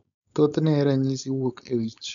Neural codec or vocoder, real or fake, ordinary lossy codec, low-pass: codec, 16 kHz, 4.8 kbps, FACodec; fake; none; 7.2 kHz